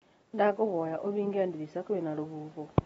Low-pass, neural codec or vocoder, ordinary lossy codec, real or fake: 19.8 kHz; none; AAC, 24 kbps; real